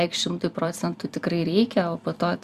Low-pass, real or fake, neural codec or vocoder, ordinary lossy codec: 14.4 kHz; fake; vocoder, 44.1 kHz, 128 mel bands every 256 samples, BigVGAN v2; Opus, 64 kbps